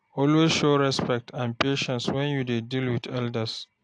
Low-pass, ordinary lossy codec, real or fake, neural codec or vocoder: 9.9 kHz; AAC, 48 kbps; real; none